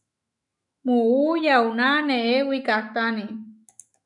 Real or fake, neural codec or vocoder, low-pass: fake; autoencoder, 48 kHz, 128 numbers a frame, DAC-VAE, trained on Japanese speech; 10.8 kHz